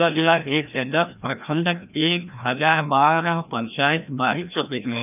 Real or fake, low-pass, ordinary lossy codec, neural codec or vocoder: fake; 3.6 kHz; none; codec, 16 kHz, 1 kbps, FreqCodec, larger model